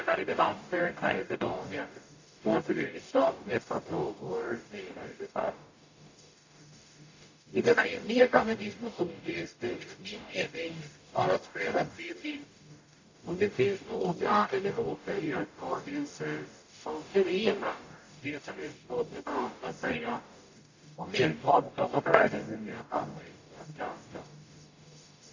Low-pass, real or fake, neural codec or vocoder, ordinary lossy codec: 7.2 kHz; fake; codec, 44.1 kHz, 0.9 kbps, DAC; AAC, 48 kbps